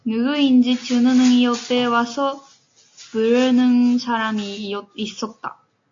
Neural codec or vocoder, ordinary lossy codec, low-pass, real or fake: none; AAC, 48 kbps; 7.2 kHz; real